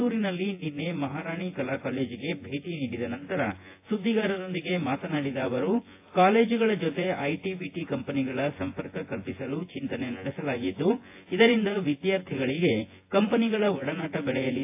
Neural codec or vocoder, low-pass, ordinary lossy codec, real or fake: vocoder, 24 kHz, 100 mel bands, Vocos; 3.6 kHz; AAC, 24 kbps; fake